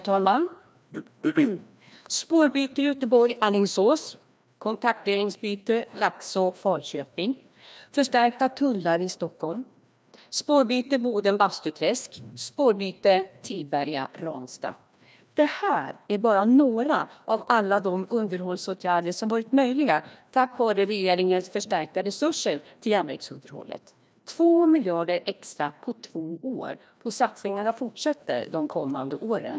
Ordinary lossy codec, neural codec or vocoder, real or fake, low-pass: none; codec, 16 kHz, 1 kbps, FreqCodec, larger model; fake; none